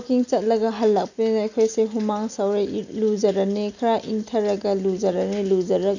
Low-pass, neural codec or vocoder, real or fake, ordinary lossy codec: 7.2 kHz; none; real; none